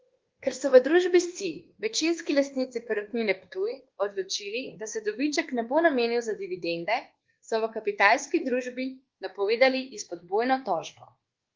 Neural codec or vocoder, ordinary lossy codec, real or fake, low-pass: codec, 24 kHz, 1.2 kbps, DualCodec; Opus, 16 kbps; fake; 7.2 kHz